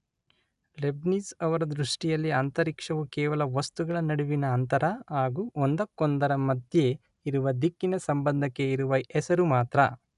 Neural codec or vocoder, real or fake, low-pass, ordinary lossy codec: none; real; 10.8 kHz; none